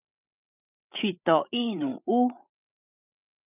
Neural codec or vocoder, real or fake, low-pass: codec, 16 kHz, 8 kbps, FreqCodec, larger model; fake; 3.6 kHz